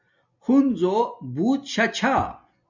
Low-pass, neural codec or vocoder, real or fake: 7.2 kHz; none; real